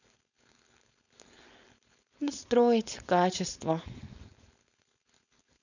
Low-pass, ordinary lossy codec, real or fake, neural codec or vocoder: 7.2 kHz; none; fake; codec, 16 kHz, 4.8 kbps, FACodec